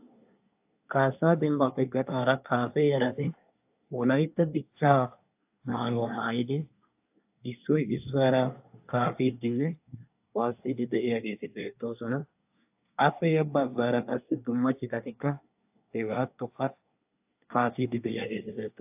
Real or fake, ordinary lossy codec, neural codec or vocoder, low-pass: fake; AAC, 32 kbps; codec, 24 kHz, 1 kbps, SNAC; 3.6 kHz